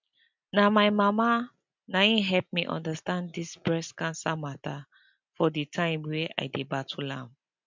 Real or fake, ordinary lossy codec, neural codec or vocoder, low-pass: real; MP3, 64 kbps; none; 7.2 kHz